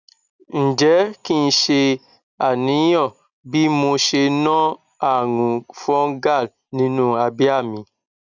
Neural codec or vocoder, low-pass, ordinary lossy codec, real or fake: none; 7.2 kHz; none; real